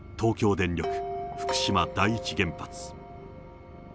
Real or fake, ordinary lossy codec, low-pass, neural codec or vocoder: real; none; none; none